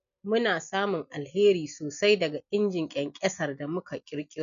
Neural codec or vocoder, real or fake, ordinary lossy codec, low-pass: none; real; none; 7.2 kHz